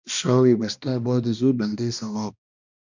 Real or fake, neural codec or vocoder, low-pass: fake; codec, 16 kHz, 1 kbps, X-Codec, HuBERT features, trained on balanced general audio; 7.2 kHz